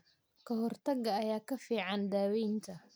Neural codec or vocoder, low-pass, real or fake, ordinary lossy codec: none; none; real; none